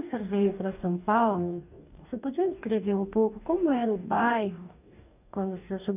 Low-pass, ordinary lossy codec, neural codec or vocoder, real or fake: 3.6 kHz; none; codec, 44.1 kHz, 2.6 kbps, DAC; fake